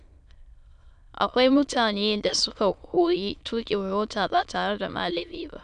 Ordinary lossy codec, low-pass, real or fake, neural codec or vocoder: none; 9.9 kHz; fake; autoencoder, 22.05 kHz, a latent of 192 numbers a frame, VITS, trained on many speakers